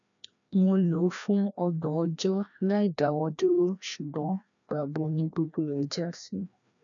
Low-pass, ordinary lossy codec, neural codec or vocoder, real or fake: 7.2 kHz; none; codec, 16 kHz, 1 kbps, FreqCodec, larger model; fake